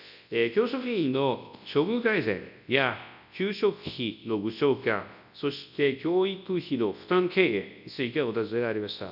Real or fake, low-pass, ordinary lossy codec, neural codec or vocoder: fake; 5.4 kHz; none; codec, 24 kHz, 0.9 kbps, WavTokenizer, large speech release